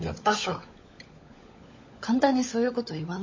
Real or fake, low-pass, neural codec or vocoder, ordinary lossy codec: fake; 7.2 kHz; codec, 16 kHz, 16 kbps, FunCodec, trained on LibriTTS, 50 frames a second; MP3, 32 kbps